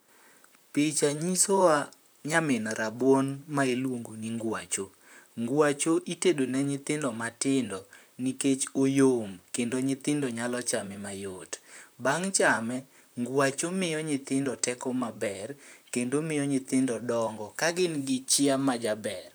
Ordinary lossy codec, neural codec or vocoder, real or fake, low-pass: none; vocoder, 44.1 kHz, 128 mel bands, Pupu-Vocoder; fake; none